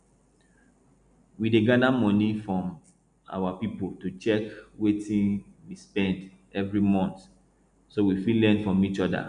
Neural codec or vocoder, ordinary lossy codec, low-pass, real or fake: none; none; 9.9 kHz; real